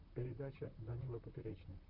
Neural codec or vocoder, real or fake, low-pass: vocoder, 44.1 kHz, 128 mel bands, Pupu-Vocoder; fake; 5.4 kHz